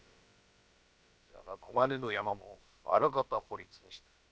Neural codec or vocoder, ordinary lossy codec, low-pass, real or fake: codec, 16 kHz, about 1 kbps, DyCAST, with the encoder's durations; none; none; fake